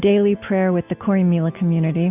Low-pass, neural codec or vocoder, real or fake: 3.6 kHz; none; real